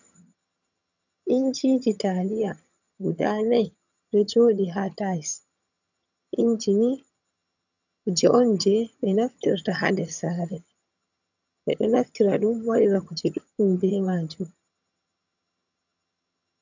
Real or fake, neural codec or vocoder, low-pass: fake; vocoder, 22.05 kHz, 80 mel bands, HiFi-GAN; 7.2 kHz